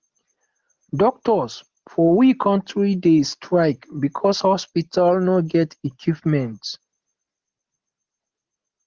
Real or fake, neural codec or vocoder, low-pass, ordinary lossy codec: real; none; 7.2 kHz; Opus, 16 kbps